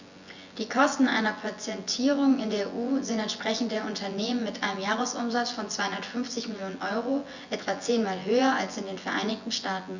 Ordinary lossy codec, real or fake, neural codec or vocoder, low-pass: Opus, 64 kbps; fake; vocoder, 24 kHz, 100 mel bands, Vocos; 7.2 kHz